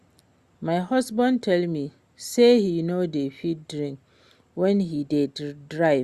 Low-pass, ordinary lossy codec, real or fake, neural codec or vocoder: 14.4 kHz; Opus, 64 kbps; real; none